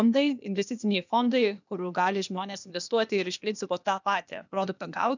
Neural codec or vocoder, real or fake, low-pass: codec, 16 kHz, 0.8 kbps, ZipCodec; fake; 7.2 kHz